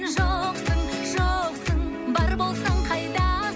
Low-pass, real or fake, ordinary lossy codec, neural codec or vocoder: none; real; none; none